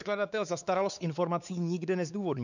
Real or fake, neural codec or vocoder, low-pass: fake; codec, 16 kHz, 4 kbps, X-Codec, WavLM features, trained on Multilingual LibriSpeech; 7.2 kHz